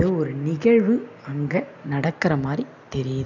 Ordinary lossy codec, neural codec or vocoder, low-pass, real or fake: none; none; 7.2 kHz; real